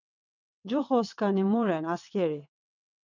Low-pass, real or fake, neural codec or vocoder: 7.2 kHz; fake; vocoder, 22.05 kHz, 80 mel bands, WaveNeXt